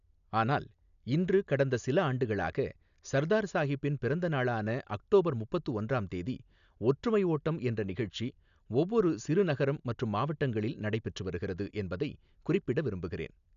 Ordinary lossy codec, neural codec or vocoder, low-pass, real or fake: none; none; 7.2 kHz; real